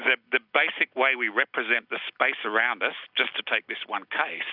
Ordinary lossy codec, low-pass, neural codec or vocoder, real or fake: Opus, 64 kbps; 5.4 kHz; none; real